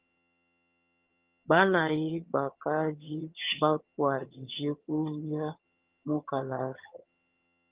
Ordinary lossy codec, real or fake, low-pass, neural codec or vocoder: Opus, 32 kbps; fake; 3.6 kHz; vocoder, 22.05 kHz, 80 mel bands, HiFi-GAN